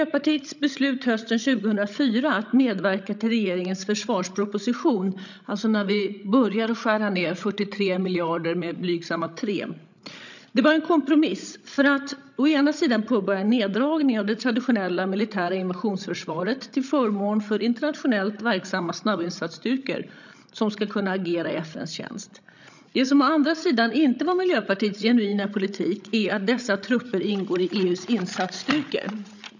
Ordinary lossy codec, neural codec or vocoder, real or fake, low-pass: none; codec, 16 kHz, 16 kbps, FreqCodec, larger model; fake; 7.2 kHz